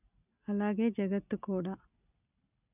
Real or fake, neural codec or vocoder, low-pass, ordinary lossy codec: real; none; 3.6 kHz; none